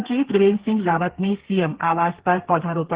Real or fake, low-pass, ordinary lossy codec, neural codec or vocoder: fake; 3.6 kHz; Opus, 16 kbps; codec, 32 kHz, 1.9 kbps, SNAC